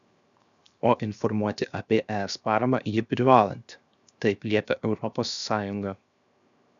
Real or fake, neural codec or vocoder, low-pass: fake; codec, 16 kHz, 0.7 kbps, FocalCodec; 7.2 kHz